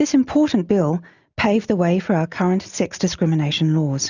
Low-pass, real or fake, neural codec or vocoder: 7.2 kHz; real; none